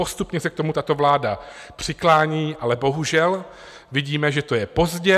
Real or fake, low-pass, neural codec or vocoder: real; 14.4 kHz; none